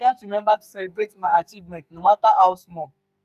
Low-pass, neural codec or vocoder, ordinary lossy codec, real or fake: 14.4 kHz; codec, 44.1 kHz, 2.6 kbps, SNAC; none; fake